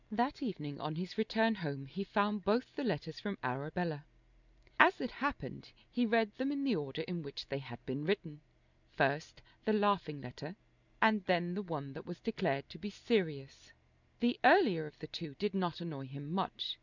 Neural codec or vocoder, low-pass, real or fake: none; 7.2 kHz; real